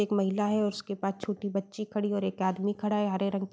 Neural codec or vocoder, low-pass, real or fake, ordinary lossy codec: none; none; real; none